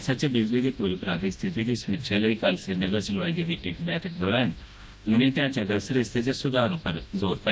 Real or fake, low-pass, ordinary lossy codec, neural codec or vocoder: fake; none; none; codec, 16 kHz, 1 kbps, FreqCodec, smaller model